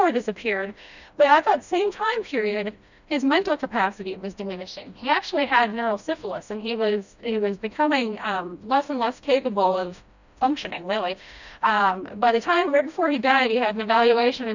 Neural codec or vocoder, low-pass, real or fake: codec, 16 kHz, 1 kbps, FreqCodec, smaller model; 7.2 kHz; fake